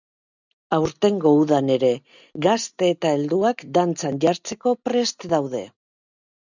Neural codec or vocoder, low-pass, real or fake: none; 7.2 kHz; real